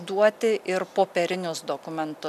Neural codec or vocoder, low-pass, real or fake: none; 14.4 kHz; real